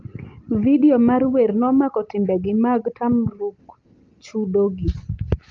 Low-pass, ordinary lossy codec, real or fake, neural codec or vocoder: 7.2 kHz; Opus, 24 kbps; real; none